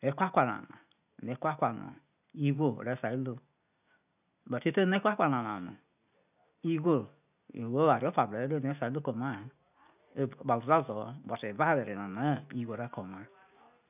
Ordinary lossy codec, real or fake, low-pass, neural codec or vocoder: none; real; 3.6 kHz; none